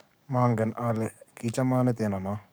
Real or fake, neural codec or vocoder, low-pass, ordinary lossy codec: fake; codec, 44.1 kHz, 7.8 kbps, DAC; none; none